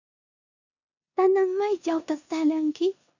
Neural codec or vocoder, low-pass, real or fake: codec, 16 kHz in and 24 kHz out, 0.9 kbps, LongCat-Audio-Codec, four codebook decoder; 7.2 kHz; fake